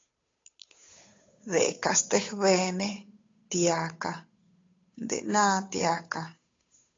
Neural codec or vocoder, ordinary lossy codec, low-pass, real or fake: codec, 16 kHz, 8 kbps, FunCodec, trained on Chinese and English, 25 frames a second; AAC, 32 kbps; 7.2 kHz; fake